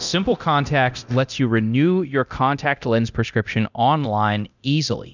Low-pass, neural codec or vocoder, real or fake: 7.2 kHz; codec, 24 kHz, 0.9 kbps, DualCodec; fake